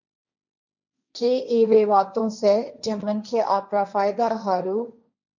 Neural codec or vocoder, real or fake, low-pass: codec, 16 kHz, 1.1 kbps, Voila-Tokenizer; fake; 7.2 kHz